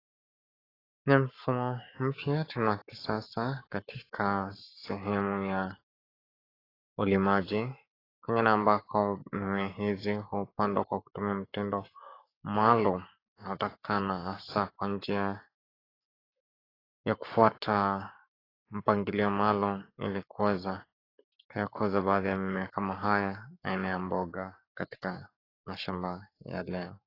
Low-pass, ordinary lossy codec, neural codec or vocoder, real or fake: 5.4 kHz; AAC, 24 kbps; codec, 44.1 kHz, 7.8 kbps, Pupu-Codec; fake